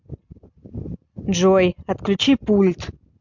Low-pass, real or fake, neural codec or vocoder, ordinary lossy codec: 7.2 kHz; real; none; MP3, 64 kbps